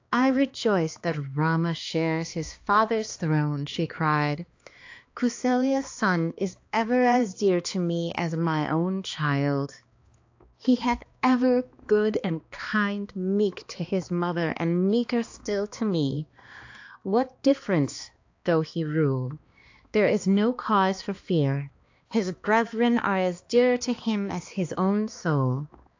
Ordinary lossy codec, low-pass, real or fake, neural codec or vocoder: AAC, 48 kbps; 7.2 kHz; fake; codec, 16 kHz, 2 kbps, X-Codec, HuBERT features, trained on balanced general audio